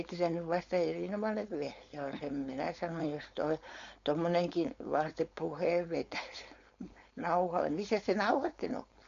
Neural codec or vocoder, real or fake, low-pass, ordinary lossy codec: codec, 16 kHz, 4.8 kbps, FACodec; fake; 7.2 kHz; MP3, 48 kbps